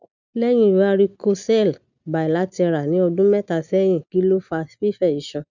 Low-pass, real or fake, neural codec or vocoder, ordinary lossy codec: 7.2 kHz; real; none; none